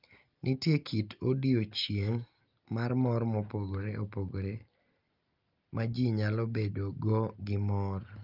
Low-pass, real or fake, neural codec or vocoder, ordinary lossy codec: 5.4 kHz; real; none; Opus, 24 kbps